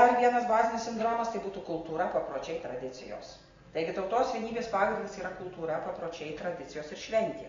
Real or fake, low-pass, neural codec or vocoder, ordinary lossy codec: real; 7.2 kHz; none; AAC, 32 kbps